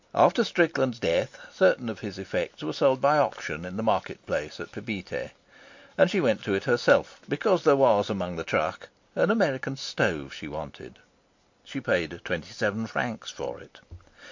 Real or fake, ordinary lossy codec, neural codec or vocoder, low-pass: real; MP3, 48 kbps; none; 7.2 kHz